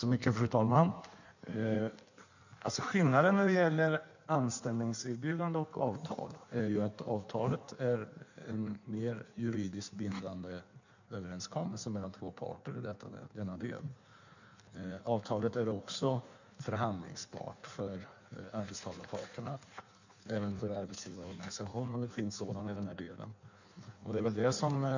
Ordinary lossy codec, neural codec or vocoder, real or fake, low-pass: none; codec, 16 kHz in and 24 kHz out, 1.1 kbps, FireRedTTS-2 codec; fake; 7.2 kHz